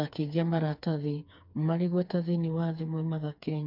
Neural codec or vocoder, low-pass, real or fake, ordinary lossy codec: codec, 16 kHz, 4 kbps, FreqCodec, smaller model; 5.4 kHz; fake; none